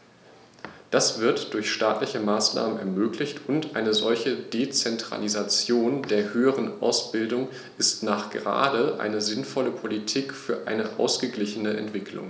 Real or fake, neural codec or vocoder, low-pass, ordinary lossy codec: real; none; none; none